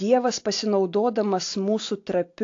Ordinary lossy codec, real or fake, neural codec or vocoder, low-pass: AAC, 48 kbps; real; none; 7.2 kHz